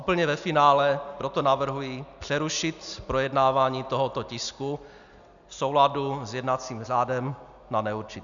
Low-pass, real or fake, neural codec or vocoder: 7.2 kHz; real; none